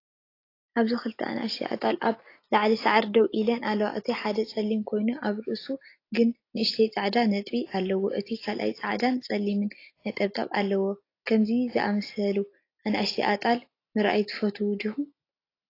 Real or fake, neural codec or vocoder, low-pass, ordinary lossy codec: real; none; 5.4 kHz; AAC, 32 kbps